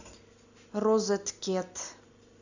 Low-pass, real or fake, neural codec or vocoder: 7.2 kHz; real; none